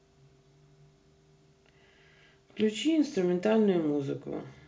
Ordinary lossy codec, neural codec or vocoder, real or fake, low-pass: none; none; real; none